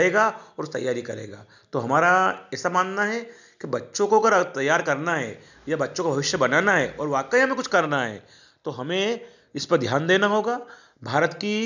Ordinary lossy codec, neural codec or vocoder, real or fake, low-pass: none; none; real; 7.2 kHz